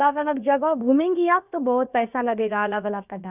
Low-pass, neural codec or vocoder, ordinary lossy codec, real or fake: 3.6 kHz; codec, 16 kHz, about 1 kbps, DyCAST, with the encoder's durations; none; fake